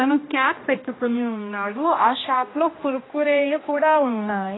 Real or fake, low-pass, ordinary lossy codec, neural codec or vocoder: fake; 7.2 kHz; AAC, 16 kbps; codec, 16 kHz, 1 kbps, X-Codec, HuBERT features, trained on general audio